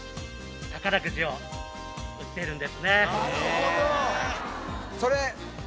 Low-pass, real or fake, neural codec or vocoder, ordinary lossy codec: none; real; none; none